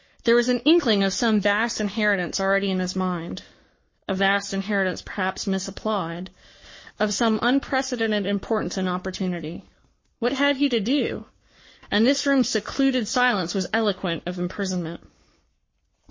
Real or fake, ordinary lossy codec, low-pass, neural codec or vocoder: fake; MP3, 32 kbps; 7.2 kHz; codec, 44.1 kHz, 7.8 kbps, Pupu-Codec